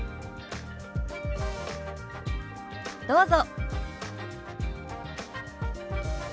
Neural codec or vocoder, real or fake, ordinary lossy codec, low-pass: none; real; none; none